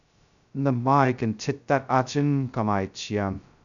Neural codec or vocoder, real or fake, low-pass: codec, 16 kHz, 0.2 kbps, FocalCodec; fake; 7.2 kHz